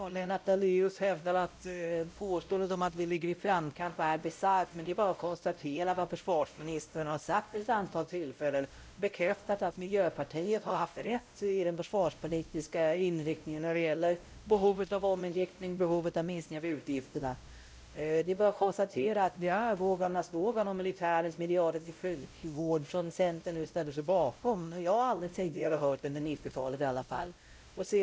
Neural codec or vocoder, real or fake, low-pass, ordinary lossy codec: codec, 16 kHz, 0.5 kbps, X-Codec, WavLM features, trained on Multilingual LibriSpeech; fake; none; none